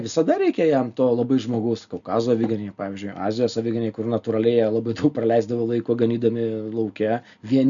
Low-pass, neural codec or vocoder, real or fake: 7.2 kHz; none; real